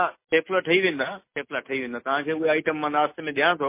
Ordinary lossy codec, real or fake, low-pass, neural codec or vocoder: MP3, 24 kbps; real; 3.6 kHz; none